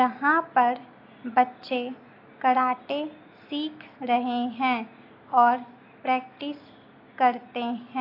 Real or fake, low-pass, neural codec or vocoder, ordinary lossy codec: real; 5.4 kHz; none; AAC, 32 kbps